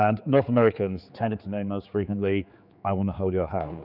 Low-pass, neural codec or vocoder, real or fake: 5.4 kHz; codec, 16 kHz, 4 kbps, X-Codec, HuBERT features, trained on general audio; fake